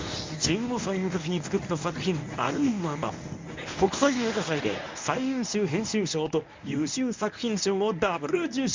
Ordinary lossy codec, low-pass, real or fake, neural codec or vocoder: MP3, 64 kbps; 7.2 kHz; fake; codec, 24 kHz, 0.9 kbps, WavTokenizer, medium speech release version 1